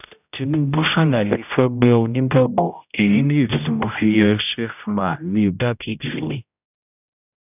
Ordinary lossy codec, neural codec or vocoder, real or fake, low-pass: none; codec, 16 kHz, 0.5 kbps, X-Codec, HuBERT features, trained on general audio; fake; 3.6 kHz